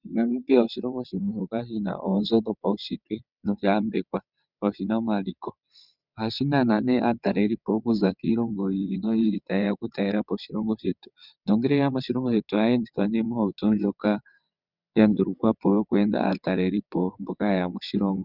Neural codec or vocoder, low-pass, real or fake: vocoder, 22.05 kHz, 80 mel bands, WaveNeXt; 5.4 kHz; fake